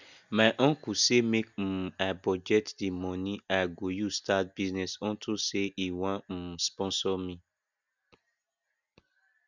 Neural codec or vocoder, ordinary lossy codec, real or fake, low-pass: none; none; real; 7.2 kHz